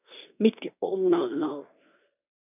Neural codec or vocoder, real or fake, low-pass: codec, 16 kHz in and 24 kHz out, 0.9 kbps, LongCat-Audio-Codec, fine tuned four codebook decoder; fake; 3.6 kHz